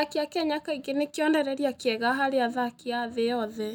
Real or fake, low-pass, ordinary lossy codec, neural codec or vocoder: real; 19.8 kHz; none; none